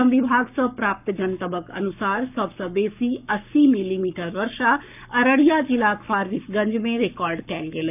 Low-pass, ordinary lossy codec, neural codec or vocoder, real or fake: 3.6 kHz; none; codec, 44.1 kHz, 7.8 kbps, Pupu-Codec; fake